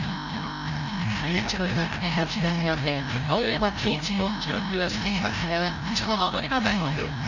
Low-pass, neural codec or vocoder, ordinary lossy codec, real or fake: 7.2 kHz; codec, 16 kHz, 0.5 kbps, FreqCodec, larger model; none; fake